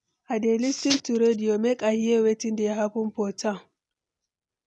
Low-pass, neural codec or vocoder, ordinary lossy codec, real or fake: none; none; none; real